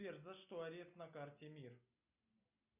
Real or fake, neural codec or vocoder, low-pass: real; none; 3.6 kHz